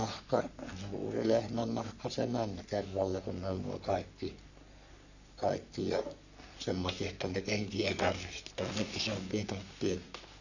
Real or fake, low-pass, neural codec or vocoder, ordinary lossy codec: fake; 7.2 kHz; codec, 44.1 kHz, 3.4 kbps, Pupu-Codec; none